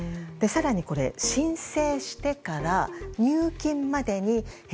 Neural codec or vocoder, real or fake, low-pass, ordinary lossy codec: none; real; none; none